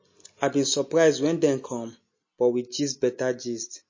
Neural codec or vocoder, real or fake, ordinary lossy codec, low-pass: none; real; MP3, 32 kbps; 7.2 kHz